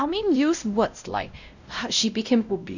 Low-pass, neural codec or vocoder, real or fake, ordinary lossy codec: 7.2 kHz; codec, 16 kHz, 0.5 kbps, X-Codec, WavLM features, trained on Multilingual LibriSpeech; fake; none